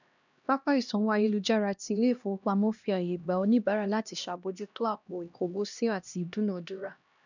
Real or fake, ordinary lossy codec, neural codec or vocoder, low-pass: fake; none; codec, 16 kHz, 1 kbps, X-Codec, HuBERT features, trained on LibriSpeech; 7.2 kHz